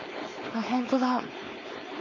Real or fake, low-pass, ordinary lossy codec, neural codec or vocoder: fake; 7.2 kHz; MP3, 32 kbps; codec, 16 kHz, 4.8 kbps, FACodec